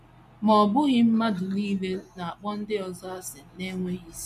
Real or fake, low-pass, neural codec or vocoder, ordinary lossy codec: real; 14.4 kHz; none; MP3, 64 kbps